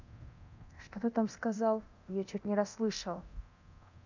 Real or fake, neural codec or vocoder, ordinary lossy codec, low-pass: fake; codec, 24 kHz, 0.9 kbps, DualCodec; none; 7.2 kHz